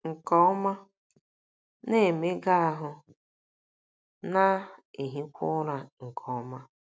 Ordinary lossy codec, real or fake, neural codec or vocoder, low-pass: none; real; none; none